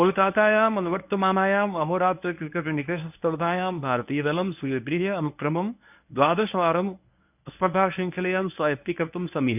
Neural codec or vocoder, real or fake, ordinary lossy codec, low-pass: codec, 24 kHz, 0.9 kbps, WavTokenizer, medium speech release version 1; fake; none; 3.6 kHz